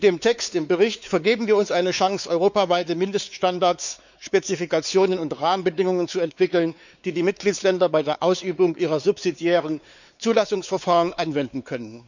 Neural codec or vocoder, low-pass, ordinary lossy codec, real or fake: codec, 16 kHz, 4 kbps, X-Codec, WavLM features, trained on Multilingual LibriSpeech; 7.2 kHz; none; fake